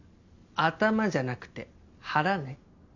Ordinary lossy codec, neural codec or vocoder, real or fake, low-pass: none; none; real; 7.2 kHz